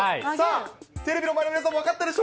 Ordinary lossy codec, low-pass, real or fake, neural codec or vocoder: none; none; real; none